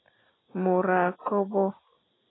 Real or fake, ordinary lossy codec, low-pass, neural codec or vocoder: real; AAC, 16 kbps; 7.2 kHz; none